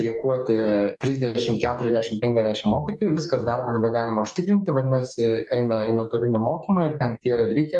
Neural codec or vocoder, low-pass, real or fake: codec, 44.1 kHz, 2.6 kbps, DAC; 10.8 kHz; fake